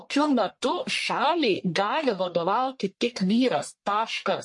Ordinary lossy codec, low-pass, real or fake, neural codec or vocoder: MP3, 48 kbps; 10.8 kHz; fake; codec, 44.1 kHz, 1.7 kbps, Pupu-Codec